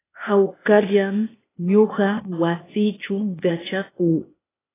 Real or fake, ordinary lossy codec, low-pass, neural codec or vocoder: fake; AAC, 16 kbps; 3.6 kHz; codec, 16 kHz, 0.8 kbps, ZipCodec